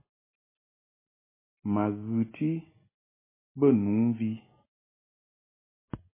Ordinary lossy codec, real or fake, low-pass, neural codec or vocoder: MP3, 16 kbps; real; 3.6 kHz; none